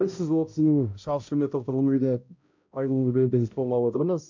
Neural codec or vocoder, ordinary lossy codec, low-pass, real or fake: codec, 16 kHz, 0.5 kbps, X-Codec, HuBERT features, trained on balanced general audio; MP3, 64 kbps; 7.2 kHz; fake